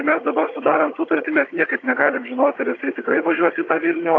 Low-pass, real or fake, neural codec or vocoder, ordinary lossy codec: 7.2 kHz; fake; vocoder, 22.05 kHz, 80 mel bands, HiFi-GAN; AAC, 32 kbps